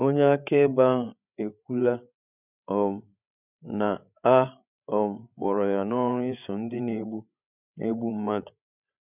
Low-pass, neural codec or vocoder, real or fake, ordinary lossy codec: 3.6 kHz; codec, 16 kHz, 8 kbps, FreqCodec, larger model; fake; none